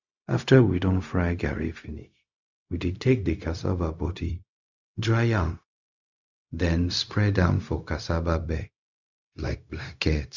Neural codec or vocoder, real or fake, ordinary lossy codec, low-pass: codec, 16 kHz, 0.4 kbps, LongCat-Audio-Codec; fake; Opus, 64 kbps; 7.2 kHz